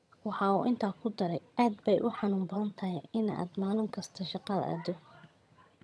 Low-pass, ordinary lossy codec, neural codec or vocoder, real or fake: none; none; vocoder, 22.05 kHz, 80 mel bands, HiFi-GAN; fake